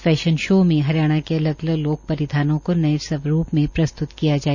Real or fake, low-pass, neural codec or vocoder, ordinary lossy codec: real; 7.2 kHz; none; none